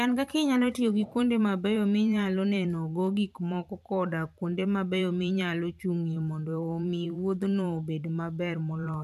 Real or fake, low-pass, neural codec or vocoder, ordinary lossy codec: fake; 14.4 kHz; vocoder, 44.1 kHz, 128 mel bands every 512 samples, BigVGAN v2; none